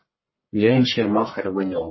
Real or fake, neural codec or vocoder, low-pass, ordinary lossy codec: fake; codec, 44.1 kHz, 1.7 kbps, Pupu-Codec; 7.2 kHz; MP3, 24 kbps